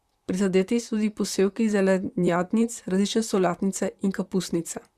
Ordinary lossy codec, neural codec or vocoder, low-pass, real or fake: Opus, 64 kbps; vocoder, 44.1 kHz, 128 mel bands, Pupu-Vocoder; 14.4 kHz; fake